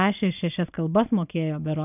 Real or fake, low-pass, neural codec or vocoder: real; 3.6 kHz; none